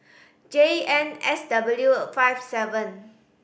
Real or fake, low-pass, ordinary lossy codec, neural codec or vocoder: real; none; none; none